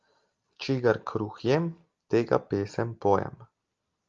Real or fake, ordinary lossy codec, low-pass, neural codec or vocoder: real; Opus, 32 kbps; 7.2 kHz; none